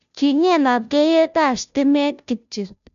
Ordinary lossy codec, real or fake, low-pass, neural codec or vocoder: MP3, 96 kbps; fake; 7.2 kHz; codec, 16 kHz, 0.5 kbps, FunCodec, trained on Chinese and English, 25 frames a second